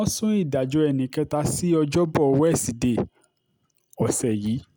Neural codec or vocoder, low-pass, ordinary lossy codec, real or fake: none; none; none; real